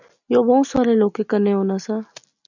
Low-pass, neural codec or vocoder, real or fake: 7.2 kHz; none; real